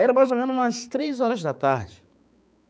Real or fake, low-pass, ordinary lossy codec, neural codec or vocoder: fake; none; none; codec, 16 kHz, 4 kbps, X-Codec, HuBERT features, trained on balanced general audio